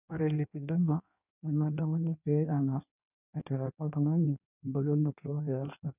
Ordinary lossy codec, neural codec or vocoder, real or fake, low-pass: none; codec, 16 kHz in and 24 kHz out, 1.1 kbps, FireRedTTS-2 codec; fake; 3.6 kHz